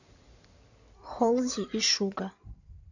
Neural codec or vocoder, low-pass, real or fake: codec, 16 kHz in and 24 kHz out, 2.2 kbps, FireRedTTS-2 codec; 7.2 kHz; fake